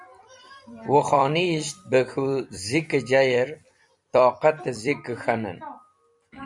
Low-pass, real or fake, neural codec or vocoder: 10.8 kHz; fake; vocoder, 44.1 kHz, 128 mel bands every 256 samples, BigVGAN v2